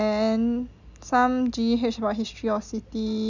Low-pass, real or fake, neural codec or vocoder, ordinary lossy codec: 7.2 kHz; real; none; none